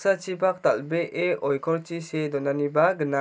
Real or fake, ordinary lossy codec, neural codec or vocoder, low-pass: real; none; none; none